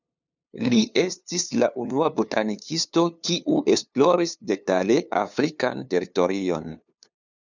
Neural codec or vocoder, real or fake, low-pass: codec, 16 kHz, 2 kbps, FunCodec, trained on LibriTTS, 25 frames a second; fake; 7.2 kHz